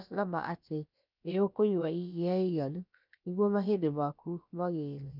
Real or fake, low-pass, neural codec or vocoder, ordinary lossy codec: fake; 5.4 kHz; codec, 16 kHz, about 1 kbps, DyCAST, with the encoder's durations; none